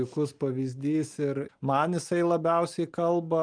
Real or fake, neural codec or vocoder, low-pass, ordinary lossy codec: real; none; 9.9 kHz; Opus, 32 kbps